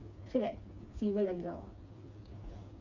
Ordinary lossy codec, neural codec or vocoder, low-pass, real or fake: none; codec, 16 kHz, 4 kbps, FreqCodec, smaller model; 7.2 kHz; fake